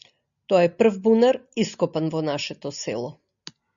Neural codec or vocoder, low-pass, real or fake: none; 7.2 kHz; real